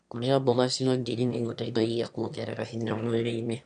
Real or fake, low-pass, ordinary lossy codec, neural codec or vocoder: fake; 9.9 kHz; none; autoencoder, 22.05 kHz, a latent of 192 numbers a frame, VITS, trained on one speaker